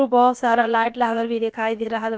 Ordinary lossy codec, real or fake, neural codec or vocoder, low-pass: none; fake; codec, 16 kHz, about 1 kbps, DyCAST, with the encoder's durations; none